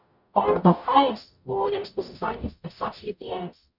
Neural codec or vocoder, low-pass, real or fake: codec, 44.1 kHz, 0.9 kbps, DAC; 5.4 kHz; fake